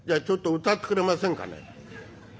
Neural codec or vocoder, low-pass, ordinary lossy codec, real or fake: none; none; none; real